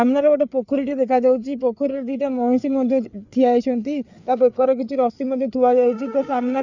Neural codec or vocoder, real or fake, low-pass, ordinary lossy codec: codec, 16 kHz, 4 kbps, FreqCodec, larger model; fake; 7.2 kHz; none